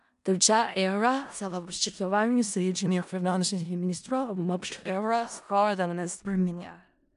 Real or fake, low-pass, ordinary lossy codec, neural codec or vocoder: fake; 10.8 kHz; none; codec, 16 kHz in and 24 kHz out, 0.4 kbps, LongCat-Audio-Codec, four codebook decoder